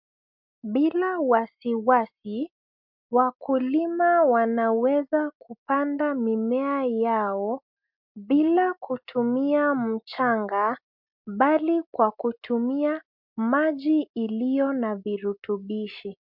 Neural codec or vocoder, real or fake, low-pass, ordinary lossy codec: none; real; 5.4 kHz; MP3, 48 kbps